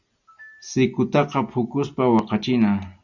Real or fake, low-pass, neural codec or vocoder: real; 7.2 kHz; none